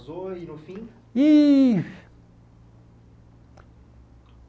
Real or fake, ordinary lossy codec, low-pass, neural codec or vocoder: real; none; none; none